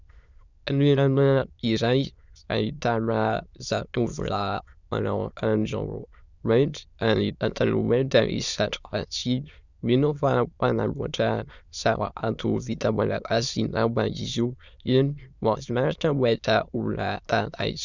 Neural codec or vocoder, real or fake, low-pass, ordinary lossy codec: autoencoder, 22.05 kHz, a latent of 192 numbers a frame, VITS, trained on many speakers; fake; 7.2 kHz; Opus, 64 kbps